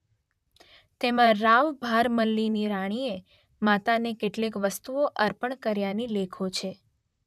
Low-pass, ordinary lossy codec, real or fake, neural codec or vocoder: 14.4 kHz; none; fake; vocoder, 44.1 kHz, 128 mel bands, Pupu-Vocoder